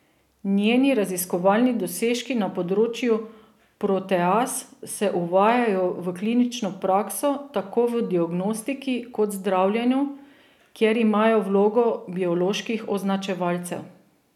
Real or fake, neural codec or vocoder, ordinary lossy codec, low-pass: real; none; none; 19.8 kHz